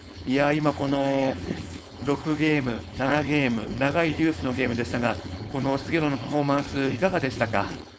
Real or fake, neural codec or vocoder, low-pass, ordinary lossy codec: fake; codec, 16 kHz, 4.8 kbps, FACodec; none; none